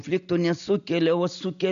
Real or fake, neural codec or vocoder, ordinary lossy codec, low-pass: real; none; AAC, 96 kbps; 7.2 kHz